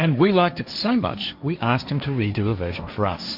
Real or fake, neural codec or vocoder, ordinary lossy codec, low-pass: fake; codec, 16 kHz, 1.1 kbps, Voila-Tokenizer; AAC, 48 kbps; 5.4 kHz